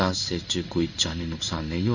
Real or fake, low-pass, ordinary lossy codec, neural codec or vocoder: fake; 7.2 kHz; none; codec, 16 kHz in and 24 kHz out, 1 kbps, XY-Tokenizer